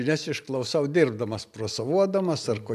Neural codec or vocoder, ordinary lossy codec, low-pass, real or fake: none; MP3, 96 kbps; 14.4 kHz; real